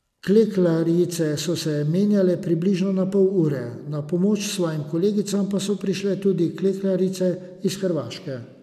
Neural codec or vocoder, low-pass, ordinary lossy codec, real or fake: none; 14.4 kHz; none; real